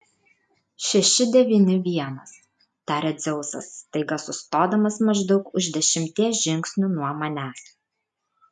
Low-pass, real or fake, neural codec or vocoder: 10.8 kHz; real; none